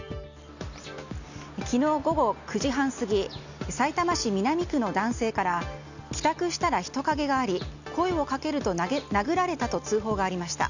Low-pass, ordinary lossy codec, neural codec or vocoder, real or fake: 7.2 kHz; none; none; real